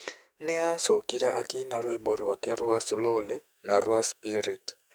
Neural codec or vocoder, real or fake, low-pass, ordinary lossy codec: codec, 44.1 kHz, 2.6 kbps, SNAC; fake; none; none